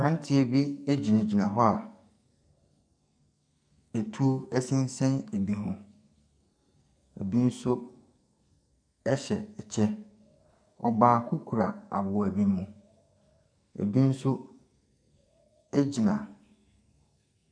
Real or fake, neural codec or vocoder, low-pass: fake; codec, 44.1 kHz, 2.6 kbps, SNAC; 9.9 kHz